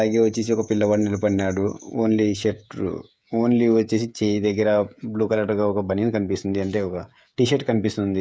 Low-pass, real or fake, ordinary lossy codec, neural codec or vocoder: none; fake; none; codec, 16 kHz, 16 kbps, FreqCodec, smaller model